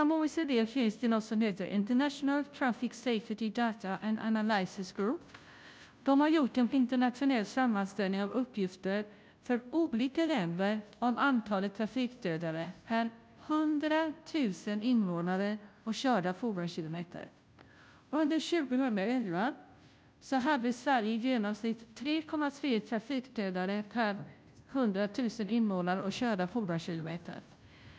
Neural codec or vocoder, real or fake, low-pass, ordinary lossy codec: codec, 16 kHz, 0.5 kbps, FunCodec, trained on Chinese and English, 25 frames a second; fake; none; none